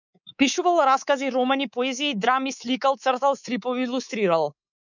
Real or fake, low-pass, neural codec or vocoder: fake; 7.2 kHz; codec, 24 kHz, 3.1 kbps, DualCodec